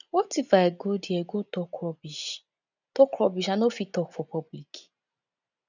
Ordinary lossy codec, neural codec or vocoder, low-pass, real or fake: none; none; 7.2 kHz; real